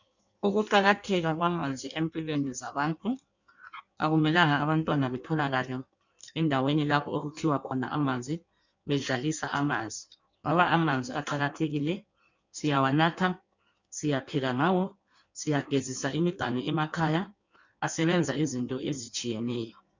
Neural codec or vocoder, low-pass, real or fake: codec, 16 kHz in and 24 kHz out, 1.1 kbps, FireRedTTS-2 codec; 7.2 kHz; fake